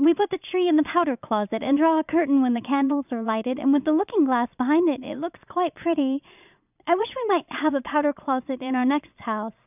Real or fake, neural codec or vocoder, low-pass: fake; vocoder, 22.05 kHz, 80 mel bands, Vocos; 3.6 kHz